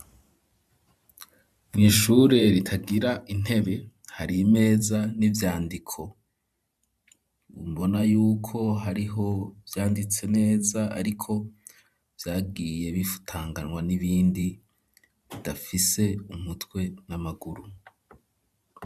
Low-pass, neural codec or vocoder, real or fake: 14.4 kHz; vocoder, 44.1 kHz, 128 mel bands every 512 samples, BigVGAN v2; fake